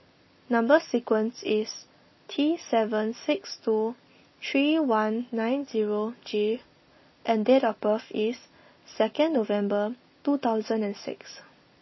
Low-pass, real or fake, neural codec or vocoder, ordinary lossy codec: 7.2 kHz; real; none; MP3, 24 kbps